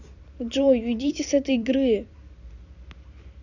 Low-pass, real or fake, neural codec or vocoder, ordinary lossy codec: 7.2 kHz; fake; vocoder, 44.1 kHz, 128 mel bands every 256 samples, BigVGAN v2; none